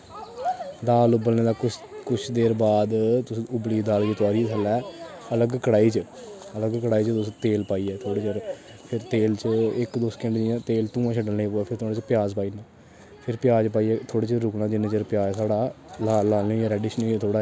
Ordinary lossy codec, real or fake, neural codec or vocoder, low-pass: none; real; none; none